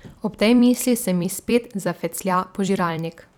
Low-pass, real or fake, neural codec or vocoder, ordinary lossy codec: 19.8 kHz; fake; vocoder, 44.1 kHz, 128 mel bands every 256 samples, BigVGAN v2; none